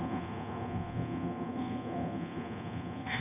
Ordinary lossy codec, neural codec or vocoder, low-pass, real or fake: AAC, 24 kbps; codec, 24 kHz, 0.9 kbps, DualCodec; 3.6 kHz; fake